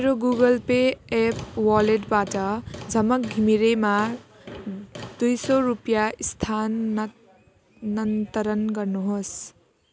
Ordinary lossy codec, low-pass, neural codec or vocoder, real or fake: none; none; none; real